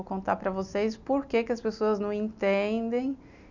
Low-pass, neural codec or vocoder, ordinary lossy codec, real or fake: 7.2 kHz; none; none; real